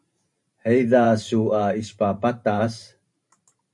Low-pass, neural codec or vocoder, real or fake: 10.8 kHz; vocoder, 44.1 kHz, 128 mel bands every 512 samples, BigVGAN v2; fake